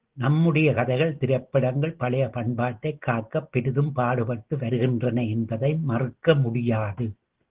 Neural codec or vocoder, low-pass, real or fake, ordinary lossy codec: none; 3.6 kHz; real; Opus, 16 kbps